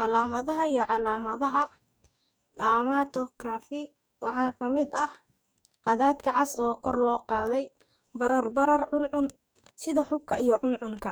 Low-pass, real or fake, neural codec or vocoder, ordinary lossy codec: none; fake; codec, 44.1 kHz, 2.6 kbps, DAC; none